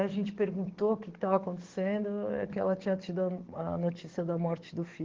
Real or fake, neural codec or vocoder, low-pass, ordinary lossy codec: fake; codec, 44.1 kHz, 7.8 kbps, DAC; 7.2 kHz; Opus, 16 kbps